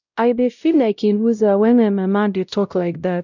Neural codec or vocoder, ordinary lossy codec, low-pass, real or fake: codec, 16 kHz, 0.5 kbps, X-Codec, WavLM features, trained on Multilingual LibriSpeech; none; 7.2 kHz; fake